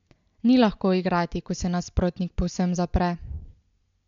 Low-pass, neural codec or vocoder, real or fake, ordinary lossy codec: 7.2 kHz; none; real; MP3, 64 kbps